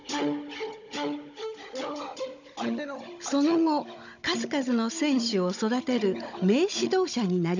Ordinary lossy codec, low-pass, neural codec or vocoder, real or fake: none; 7.2 kHz; codec, 16 kHz, 16 kbps, FunCodec, trained on Chinese and English, 50 frames a second; fake